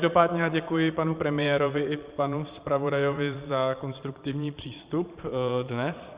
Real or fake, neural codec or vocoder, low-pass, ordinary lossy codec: fake; vocoder, 22.05 kHz, 80 mel bands, Vocos; 3.6 kHz; Opus, 64 kbps